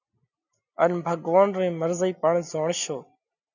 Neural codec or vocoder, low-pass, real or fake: none; 7.2 kHz; real